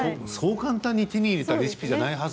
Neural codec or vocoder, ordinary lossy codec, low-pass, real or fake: none; none; none; real